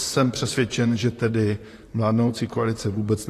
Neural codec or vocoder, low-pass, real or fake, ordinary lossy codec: vocoder, 44.1 kHz, 128 mel bands, Pupu-Vocoder; 14.4 kHz; fake; AAC, 48 kbps